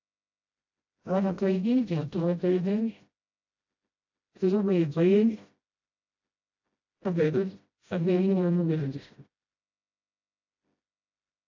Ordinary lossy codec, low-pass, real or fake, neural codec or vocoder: AAC, 48 kbps; 7.2 kHz; fake; codec, 16 kHz, 0.5 kbps, FreqCodec, smaller model